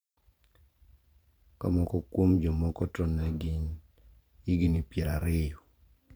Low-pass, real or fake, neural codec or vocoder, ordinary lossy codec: none; fake; vocoder, 44.1 kHz, 128 mel bands every 512 samples, BigVGAN v2; none